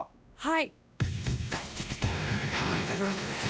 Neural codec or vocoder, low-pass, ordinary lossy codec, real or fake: codec, 16 kHz, 1 kbps, X-Codec, WavLM features, trained on Multilingual LibriSpeech; none; none; fake